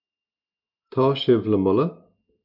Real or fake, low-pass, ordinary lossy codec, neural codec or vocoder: real; 5.4 kHz; MP3, 48 kbps; none